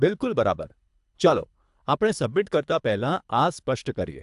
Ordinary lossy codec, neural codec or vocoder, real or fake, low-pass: AAC, 96 kbps; codec, 24 kHz, 3 kbps, HILCodec; fake; 10.8 kHz